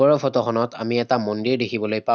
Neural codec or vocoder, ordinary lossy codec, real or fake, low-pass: none; none; real; none